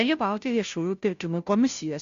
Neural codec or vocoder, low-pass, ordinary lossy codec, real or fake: codec, 16 kHz, 0.5 kbps, FunCodec, trained on Chinese and English, 25 frames a second; 7.2 kHz; AAC, 64 kbps; fake